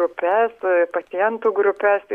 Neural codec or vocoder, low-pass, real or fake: none; 14.4 kHz; real